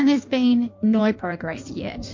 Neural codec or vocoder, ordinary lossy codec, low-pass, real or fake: codec, 16 kHz in and 24 kHz out, 1.1 kbps, FireRedTTS-2 codec; MP3, 48 kbps; 7.2 kHz; fake